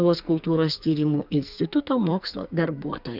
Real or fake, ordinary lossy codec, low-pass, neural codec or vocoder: fake; Opus, 64 kbps; 5.4 kHz; codec, 44.1 kHz, 2.6 kbps, SNAC